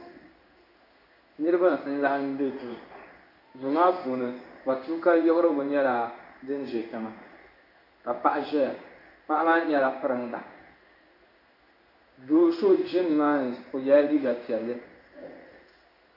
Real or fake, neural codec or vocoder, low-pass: fake; codec, 16 kHz in and 24 kHz out, 1 kbps, XY-Tokenizer; 5.4 kHz